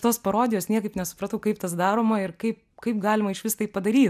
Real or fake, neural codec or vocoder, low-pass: real; none; 14.4 kHz